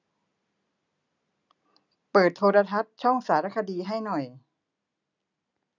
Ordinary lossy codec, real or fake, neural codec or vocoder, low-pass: none; real; none; 7.2 kHz